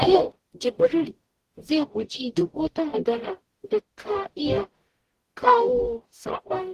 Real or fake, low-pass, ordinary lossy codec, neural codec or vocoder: fake; 14.4 kHz; Opus, 16 kbps; codec, 44.1 kHz, 0.9 kbps, DAC